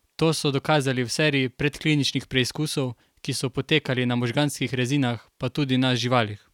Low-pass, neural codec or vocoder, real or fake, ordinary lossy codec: 19.8 kHz; none; real; none